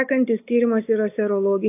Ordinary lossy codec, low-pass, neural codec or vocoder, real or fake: AAC, 32 kbps; 3.6 kHz; none; real